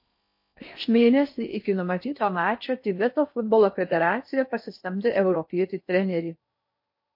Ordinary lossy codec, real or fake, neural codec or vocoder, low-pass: MP3, 32 kbps; fake; codec, 16 kHz in and 24 kHz out, 0.6 kbps, FocalCodec, streaming, 4096 codes; 5.4 kHz